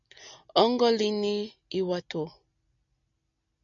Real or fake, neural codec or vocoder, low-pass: real; none; 7.2 kHz